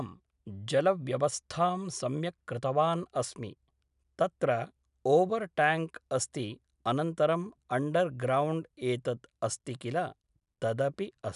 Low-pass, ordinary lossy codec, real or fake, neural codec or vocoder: 10.8 kHz; none; real; none